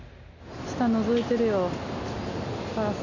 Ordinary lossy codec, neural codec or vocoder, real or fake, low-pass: none; none; real; 7.2 kHz